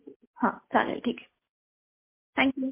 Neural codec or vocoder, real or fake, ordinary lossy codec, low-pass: none; real; MP3, 24 kbps; 3.6 kHz